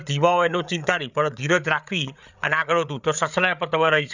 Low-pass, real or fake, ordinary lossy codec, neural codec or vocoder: 7.2 kHz; fake; none; codec, 16 kHz, 8 kbps, FreqCodec, larger model